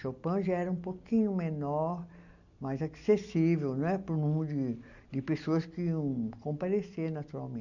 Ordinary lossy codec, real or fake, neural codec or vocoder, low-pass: none; real; none; 7.2 kHz